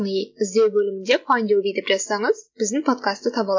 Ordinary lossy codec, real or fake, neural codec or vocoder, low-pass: MP3, 48 kbps; real; none; 7.2 kHz